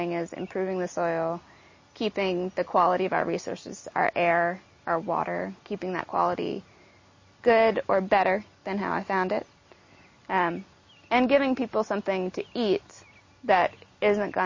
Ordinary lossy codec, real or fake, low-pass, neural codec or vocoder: MP3, 32 kbps; real; 7.2 kHz; none